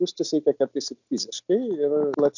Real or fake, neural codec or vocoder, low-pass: real; none; 7.2 kHz